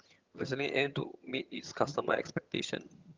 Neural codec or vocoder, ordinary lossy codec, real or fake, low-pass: vocoder, 22.05 kHz, 80 mel bands, HiFi-GAN; Opus, 32 kbps; fake; 7.2 kHz